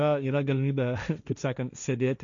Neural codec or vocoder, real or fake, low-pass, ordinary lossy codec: codec, 16 kHz, 1.1 kbps, Voila-Tokenizer; fake; 7.2 kHz; MP3, 96 kbps